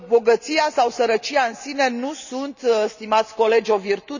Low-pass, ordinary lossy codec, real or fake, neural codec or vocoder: 7.2 kHz; none; real; none